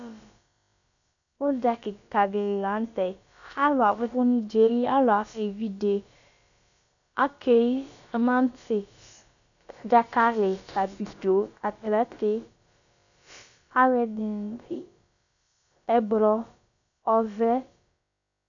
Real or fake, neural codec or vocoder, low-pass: fake; codec, 16 kHz, about 1 kbps, DyCAST, with the encoder's durations; 7.2 kHz